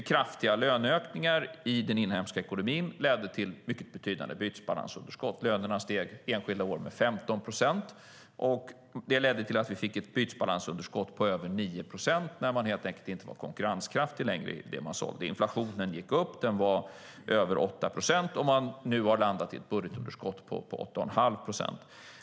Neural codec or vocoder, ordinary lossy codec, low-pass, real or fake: none; none; none; real